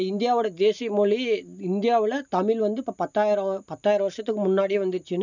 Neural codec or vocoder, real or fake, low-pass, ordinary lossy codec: none; real; 7.2 kHz; none